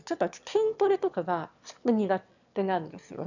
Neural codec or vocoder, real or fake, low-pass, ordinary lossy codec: autoencoder, 22.05 kHz, a latent of 192 numbers a frame, VITS, trained on one speaker; fake; 7.2 kHz; none